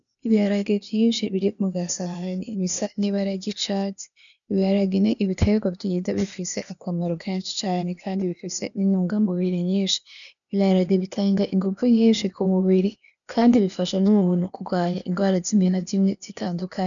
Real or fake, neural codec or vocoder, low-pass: fake; codec, 16 kHz, 0.8 kbps, ZipCodec; 7.2 kHz